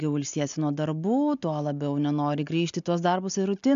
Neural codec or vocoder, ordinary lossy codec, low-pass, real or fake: none; MP3, 64 kbps; 7.2 kHz; real